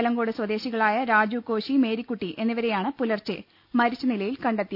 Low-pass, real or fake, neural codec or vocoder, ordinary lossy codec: 5.4 kHz; real; none; none